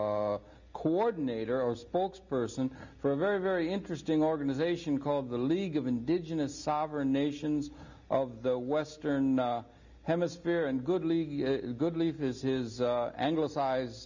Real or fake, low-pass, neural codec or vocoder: real; 7.2 kHz; none